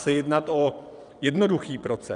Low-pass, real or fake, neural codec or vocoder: 9.9 kHz; fake; vocoder, 22.05 kHz, 80 mel bands, WaveNeXt